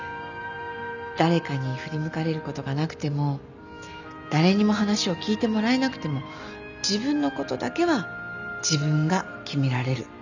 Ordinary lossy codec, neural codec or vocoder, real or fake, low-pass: none; none; real; 7.2 kHz